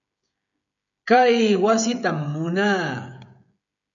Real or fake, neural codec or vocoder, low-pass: fake; codec, 16 kHz, 16 kbps, FreqCodec, smaller model; 7.2 kHz